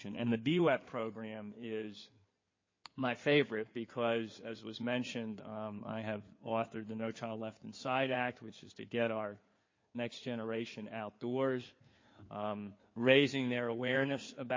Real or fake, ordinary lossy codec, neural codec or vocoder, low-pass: fake; MP3, 32 kbps; codec, 16 kHz in and 24 kHz out, 2.2 kbps, FireRedTTS-2 codec; 7.2 kHz